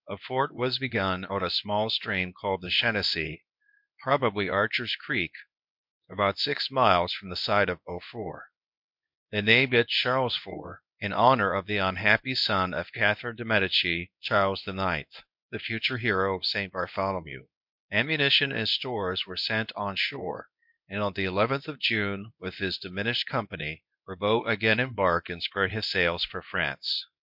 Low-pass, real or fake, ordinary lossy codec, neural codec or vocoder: 5.4 kHz; fake; MP3, 48 kbps; codec, 24 kHz, 0.9 kbps, WavTokenizer, medium speech release version 1